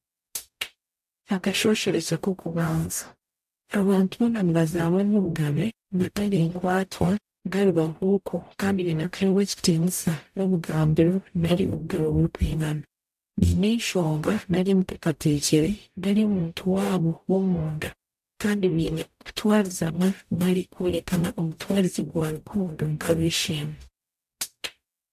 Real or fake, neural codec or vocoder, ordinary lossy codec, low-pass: fake; codec, 44.1 kHz, 0.9 kbps, DAC; AAC, 96 kbps; 14.4 kHz